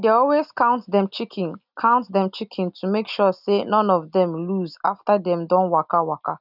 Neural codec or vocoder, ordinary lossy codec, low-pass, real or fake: none; none; 5.4 kHz; real